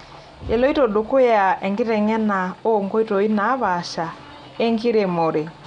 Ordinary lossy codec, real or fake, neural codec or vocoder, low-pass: none; real; none; 9.9 kHz